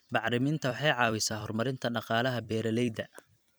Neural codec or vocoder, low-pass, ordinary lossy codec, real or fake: vocoder, 44.1 kHz, 128 mel bands every 256 samples, BigVGAN v2; none; none; fake